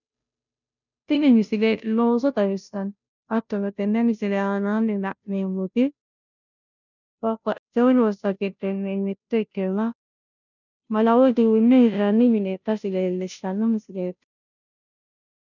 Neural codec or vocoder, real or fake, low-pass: codec, 16 kHz, 0.5 kbps, FunCodec, trained on Chinese and English, 25 frames a second; fake; 7.2 kHz